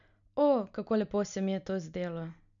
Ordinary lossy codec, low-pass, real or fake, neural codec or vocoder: none; 7.2 kHz; real; none